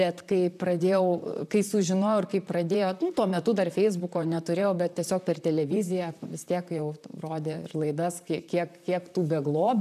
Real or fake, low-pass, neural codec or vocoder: fake; 14.4 kHz; vocoder, 44.1 kHz, 128 mel bands, Pupu-Vocoder